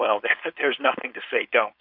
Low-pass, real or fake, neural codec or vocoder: 5.4 kHz; fake; codec, 16 kHz, 4.8 kbps, FACodec